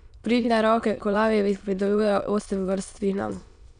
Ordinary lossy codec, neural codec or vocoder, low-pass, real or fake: MP3, 96 kbps; autoencoder, 22.05 kHz, a latent of 192 numbers a frame, VITS, trained on many speakers; 9.9 kHz; fake